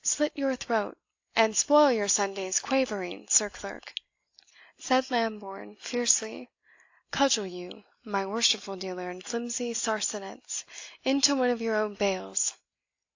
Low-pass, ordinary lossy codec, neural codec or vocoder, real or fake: 7.2 kHz; AAC, 48 kbps; none; real